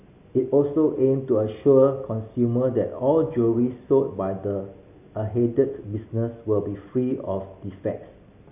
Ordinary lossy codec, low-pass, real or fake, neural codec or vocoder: none; 3.6 kHz; real; none